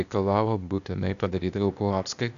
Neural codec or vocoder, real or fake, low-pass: codec, 16 kHz, 0.8 kbps, ZipCodec; fake; 7.2 kHz